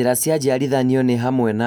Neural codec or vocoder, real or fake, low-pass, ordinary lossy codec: none; real; none; none